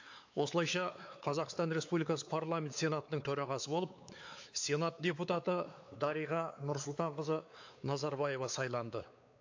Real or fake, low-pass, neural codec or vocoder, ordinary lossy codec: fake; 7.2 kHz; codec, 16 kHz, 4 kbps, X-Codec, WavLM features, trained on Multilingual LibriSpeech; AAC, 48 kbps